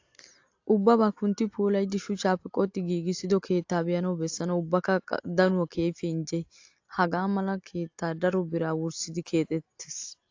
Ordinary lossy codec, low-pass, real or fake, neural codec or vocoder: AAC, 48 kbps; 7.2 kHz; real; none